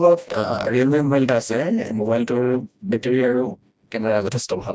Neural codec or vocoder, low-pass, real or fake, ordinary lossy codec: codec, 16 kHz, 1 kbps, FreqCodec, smaller model; none; fake; none